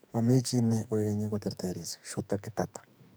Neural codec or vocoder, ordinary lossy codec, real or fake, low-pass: codec, 44.1 kHz, 2.6 kbps, SNAC; none; fake; none